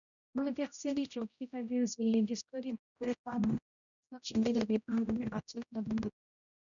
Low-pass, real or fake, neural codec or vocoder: 7.2 kHz; fake; codec, 16 kHz, 0.5 kbps, X-Codec, HuBERT features, trained on general audio